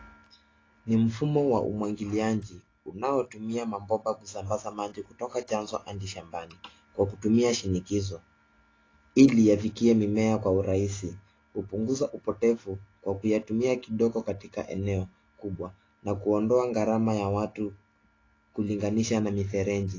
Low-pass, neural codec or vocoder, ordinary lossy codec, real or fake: 7.2 kHz; none; AAC, 32 kbps; real